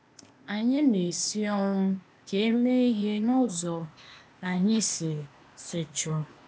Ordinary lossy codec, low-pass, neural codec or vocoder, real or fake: none; none; codec, 16 kHz, 0.8 kbps, ZipCodec; fake